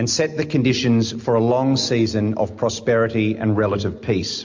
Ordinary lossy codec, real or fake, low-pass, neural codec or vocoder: MP3, 48 kbps; real; 7.2 kHz; none